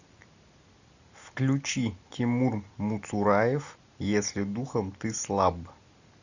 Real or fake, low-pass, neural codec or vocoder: real; 7.2 kHz; none